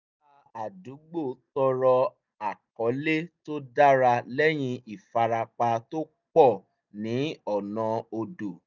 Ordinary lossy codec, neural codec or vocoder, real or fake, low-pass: none; none; real; 7.2 kHz